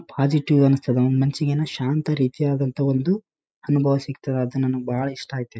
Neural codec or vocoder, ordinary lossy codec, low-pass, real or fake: none; none; none; real